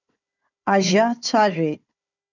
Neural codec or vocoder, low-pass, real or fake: codec, 16 kHz, 4 kbps, FunCodec, trained on Chinese and English, 50 frames a second; 7.2 kHz; fake